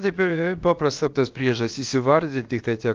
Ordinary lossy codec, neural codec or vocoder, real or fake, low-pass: Opus, 24 kbps; codec, 16 kHz, about 1 kbps, DyCAST, with the encoder's durations; fake; 7.2 kHz